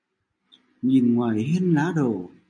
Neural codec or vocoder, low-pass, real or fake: none; 9.9 kHz; real